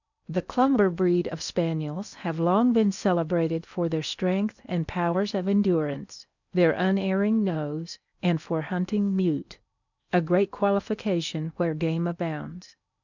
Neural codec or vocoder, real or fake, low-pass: codec, 16 kHz in and 24 kHz out, 0.8 kbps, FocalCodec, streaming, 65536 codes; fake; 7.2 kHz